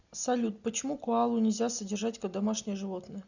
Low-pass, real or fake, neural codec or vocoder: 7.2 kHz; real; none